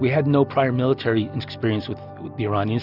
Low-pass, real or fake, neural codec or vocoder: 5.4 kHz; real; none